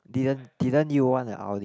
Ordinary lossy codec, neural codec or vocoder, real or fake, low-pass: none; none; real; none